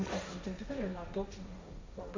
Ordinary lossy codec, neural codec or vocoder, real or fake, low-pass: MP3, 64 kbps; codec, 16 kHz, 1.1 kbps, Voila-Tokenizer; fake; 7.2 kHz